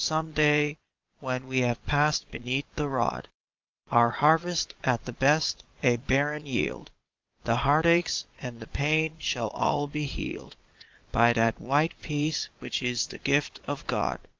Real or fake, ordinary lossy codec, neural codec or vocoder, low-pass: fake; Opus, 24 kbps; vocoder, 22.05 kHz, 80 mel bands, Vocos; 7.2 kHz